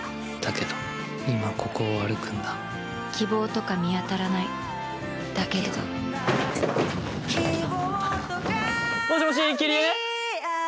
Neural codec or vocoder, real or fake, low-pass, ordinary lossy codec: none; real; none; none